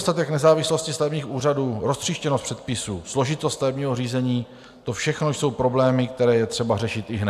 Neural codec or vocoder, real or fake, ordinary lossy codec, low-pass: none; real; AAC, 96 kbps; 14.4 kHz